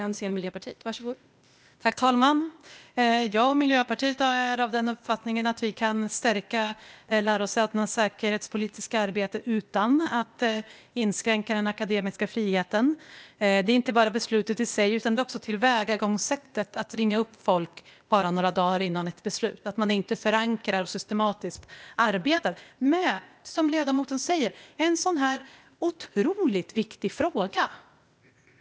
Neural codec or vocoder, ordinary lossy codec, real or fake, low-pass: codec, 16 kHz, 0.8 kbps, ZipCodec; none; fake; none